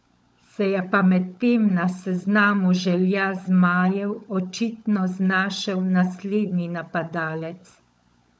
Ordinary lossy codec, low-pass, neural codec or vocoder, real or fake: none; none; codec, 16 kHz, 16 kbps, FunCodec, trained on LibriTTS, 50 frames a second; fake